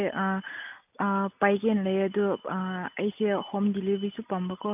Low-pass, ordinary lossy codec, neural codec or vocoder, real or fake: 3.6 kHz; none; none; real